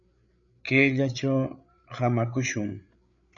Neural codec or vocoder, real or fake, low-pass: codec, 16 kHz, 8 kbps, FreqCodec, larger model; fake; 7.2 kHz